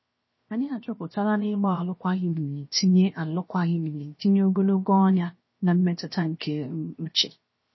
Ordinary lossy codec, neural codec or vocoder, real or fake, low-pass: MP3, 24 kbps; codec, 16 kHz, 0.7 kbps, FocalCodec; fake; 7.2 kHz